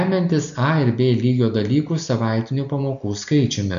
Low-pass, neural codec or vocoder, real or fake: 7.2 kHz; none; real